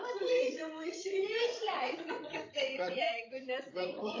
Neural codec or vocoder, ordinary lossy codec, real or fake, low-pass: codec, 16 kHz, 16 kbps, FreqCodec, larger model; AAC, 32 kbps; fake; 7.2 kHz